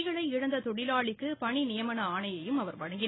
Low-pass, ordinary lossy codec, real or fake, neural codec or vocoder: 7.2 kHz; AAC, 16 kbps; real; none